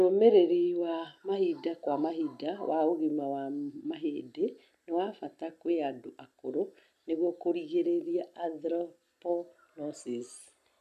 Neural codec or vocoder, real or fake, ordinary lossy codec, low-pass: none; real; none; 14.4 kHz